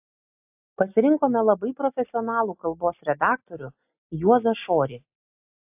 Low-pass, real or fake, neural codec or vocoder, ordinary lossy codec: 3.6 kHz; real; none; AAC, 32 kbps